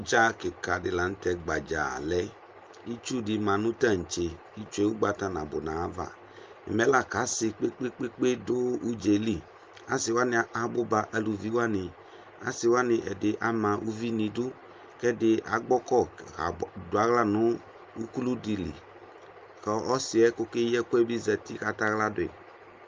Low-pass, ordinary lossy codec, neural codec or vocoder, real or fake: 7.2 kHz; Opus, 24 kbps; none; real